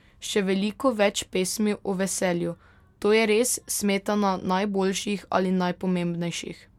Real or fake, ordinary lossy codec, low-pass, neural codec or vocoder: real; MP3, 96 kbps; 19.8 kHz; none